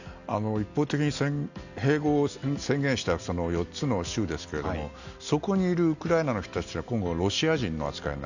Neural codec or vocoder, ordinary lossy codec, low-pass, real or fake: none; none; 7.2 kHz; real